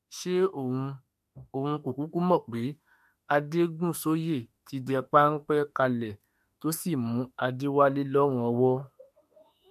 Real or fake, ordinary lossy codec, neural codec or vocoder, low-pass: fake; MP3, 64 kbps; autoencoder, 48 kHz, 32 numbers a frame, DAC-VAE, trained on Japanese speech; 14.4 kHz